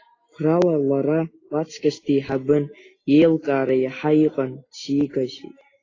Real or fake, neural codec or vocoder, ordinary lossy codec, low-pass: real; none; AAC, 32 kbps; 7.2 kHz